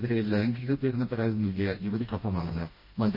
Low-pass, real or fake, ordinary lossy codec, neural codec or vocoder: 5.4 kHz; fake; MP3, 24 kbps; codec, 16 kHz, 1 kbps, FreqCodec, smaller model